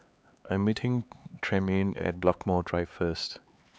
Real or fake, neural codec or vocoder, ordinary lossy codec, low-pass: fake; codec, 16 kHz, 4 kbps, X-Codec, HuBERT features, trained on LibriSpeech; none; none